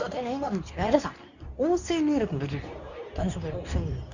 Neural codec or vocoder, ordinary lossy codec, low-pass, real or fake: codec, 24 kHz, 0.9 kbps, WavTokenizer, medium speech release version 2; Opus, 64 kbps; 7.2 kHz; fake